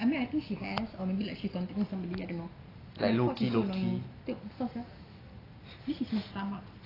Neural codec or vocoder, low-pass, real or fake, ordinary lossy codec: none; 5.4 kHz; real; AAC, 24 kbps